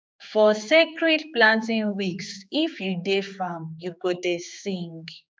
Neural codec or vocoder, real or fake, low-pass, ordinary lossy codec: codec, 16 kHz, 4 kbps, X-Codec, HuBERT features, trained on general audio; fake; none; none